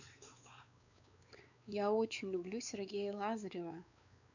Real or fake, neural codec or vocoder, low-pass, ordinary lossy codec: fake; codec, 16 kHz, 4 kbps, X-Codec, WavLM features, trained on Multilingual LibriSpeech; 7.2 kHz; none